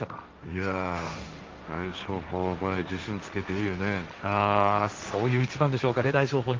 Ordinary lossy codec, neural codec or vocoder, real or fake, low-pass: Opus, 24 kbps; codec, 16 kHz, 1.1 kbps, Voila-Tokenizer; fake; 7.2 kHz